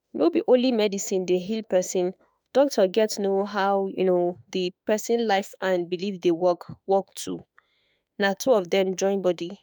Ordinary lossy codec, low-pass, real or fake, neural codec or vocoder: none; none; fake; autoencoder, 48 kHz, 32 numbers a frame, DAC-VAE, trained on Japanese speech